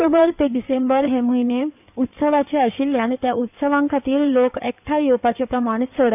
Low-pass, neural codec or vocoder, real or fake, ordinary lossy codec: 3.6 kHz; codec, 16 kHz in and 24 kHz out, 2.2 kbps, FireRedTTS-2 codec; fake; none